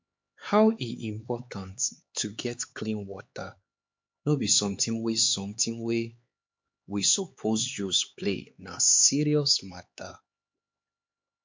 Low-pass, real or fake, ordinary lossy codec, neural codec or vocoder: 7.2 kHz; fake; MP3, 48 kbps; codec, 16 kHz, 4 kbps, X-Codec, HuBERT features, trained on LibriSpeech